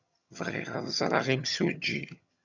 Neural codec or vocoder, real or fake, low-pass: vocoder, 22.05 kHz, 80 mel bands, HiFi-GAN; fake; 7.2 kHz